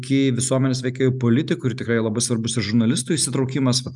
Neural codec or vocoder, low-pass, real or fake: none; 10.8 kHz; real